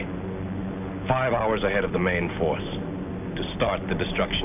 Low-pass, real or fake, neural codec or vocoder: 3.6 kHz; real; none